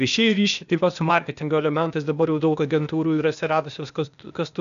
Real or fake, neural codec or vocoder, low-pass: fake; codec, 16 kHz, 0.8 kbps, ZipCodec; 7.2 kHz